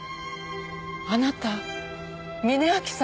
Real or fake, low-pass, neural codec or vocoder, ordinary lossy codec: real; none; none; none